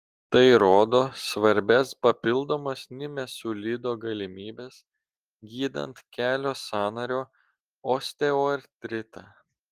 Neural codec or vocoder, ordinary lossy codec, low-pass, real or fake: none; Opus, 24 kbps; 14.4 kHz; real